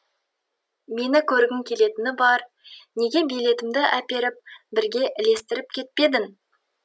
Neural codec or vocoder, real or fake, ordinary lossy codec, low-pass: none; real; none; none